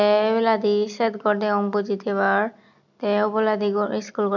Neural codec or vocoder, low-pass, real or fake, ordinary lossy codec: none; 7.2 kHz; real; none